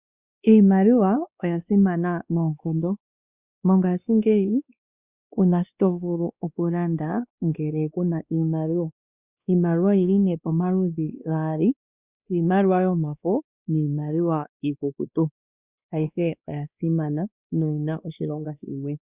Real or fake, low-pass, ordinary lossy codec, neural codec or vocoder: fake; 3.6 kHz; Opus, 64 kbps; codec, 16 kHz, 2 kbps, X-Codec, WavLM features, trained on Multilingual LibriSpeech